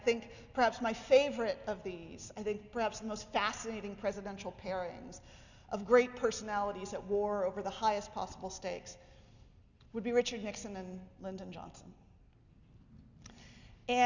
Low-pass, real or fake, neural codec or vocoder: 7.2 kHz; real; none